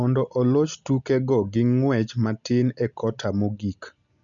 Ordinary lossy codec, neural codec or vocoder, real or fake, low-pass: none; none; real; 7.2 kHz